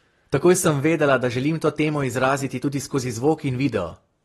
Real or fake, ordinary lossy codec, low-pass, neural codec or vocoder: real; AAC, 32 kbps; 19.8 kHz; none